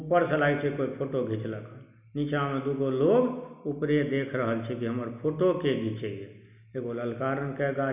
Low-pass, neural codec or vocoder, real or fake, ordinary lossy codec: 3.6 kHz; none; real; none